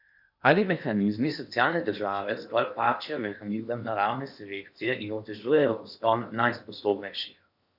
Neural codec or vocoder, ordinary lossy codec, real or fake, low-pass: codec, 16 kHz in and 24 kHz out, 0.8 kbps, FocalCodec, streaming, 65536 codes; none; fake; 5.4 kHz